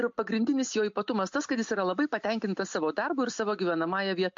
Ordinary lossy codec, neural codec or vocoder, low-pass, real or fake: MP3, 48 kbps; none; 7.2 kHz; real